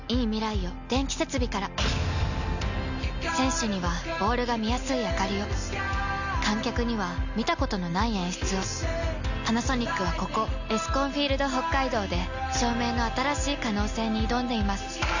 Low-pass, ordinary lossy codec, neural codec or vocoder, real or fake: 7.2 kHz; none; none; real